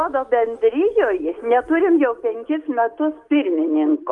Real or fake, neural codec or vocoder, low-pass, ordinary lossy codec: real; none; 10.8 kHz; Opus, 32 kbps